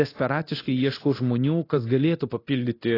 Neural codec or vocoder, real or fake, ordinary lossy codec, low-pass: codec, 24 kHz, 0.9 kbps, DualCodec; fake; AAC, 24 kbps; 5.4 kHz